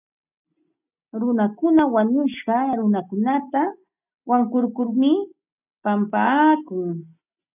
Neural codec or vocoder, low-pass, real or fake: none; 3.6 kHz; real